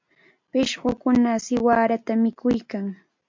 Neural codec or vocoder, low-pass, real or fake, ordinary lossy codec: none; 7.2 kHz; real; MP3, 64 kbps